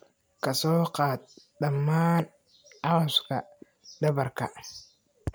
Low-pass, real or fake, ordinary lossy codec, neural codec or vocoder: none; real; none; none